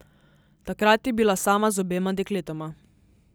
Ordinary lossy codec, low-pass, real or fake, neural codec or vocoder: none; none; real; none